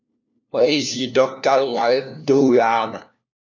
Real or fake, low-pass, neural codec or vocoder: fake; 7.2 kHz; codec, 16 kHz, 1 kbps, FunCodec, trained on LibriTTS, 50 frames a second